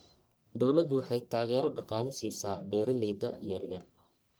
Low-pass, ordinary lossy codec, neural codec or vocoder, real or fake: none; none; codec, 44.1 kHz, 1.7 kbps, Pupu-Codec; fake